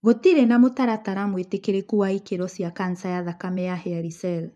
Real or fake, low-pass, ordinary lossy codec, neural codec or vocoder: fake; none; none; vocoder, 24 kHz, 100 mel bands, Vocos